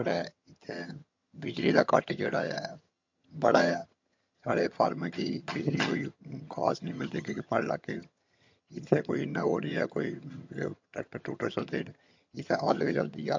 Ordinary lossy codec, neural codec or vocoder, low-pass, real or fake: MP3, 48 kbps; vocoder, 22.05 kHz, 80 mel bands, HiFi-GAN; 7.2 kHz; fake